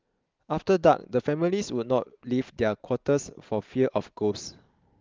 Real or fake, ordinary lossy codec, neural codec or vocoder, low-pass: real; Opus, 32 kbps; none; 7.2 kHz